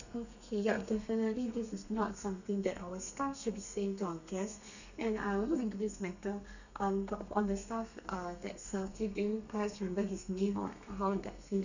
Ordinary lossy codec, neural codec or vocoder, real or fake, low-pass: none; codec, 32 kHz, 1.9 kbps, SNAC; fake; 7.2 kHz